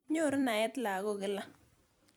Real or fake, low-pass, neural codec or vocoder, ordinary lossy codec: fake; none; vocoder, 44.1 kHz, 128 mel bands every 256 samples, BigVGAN v2; none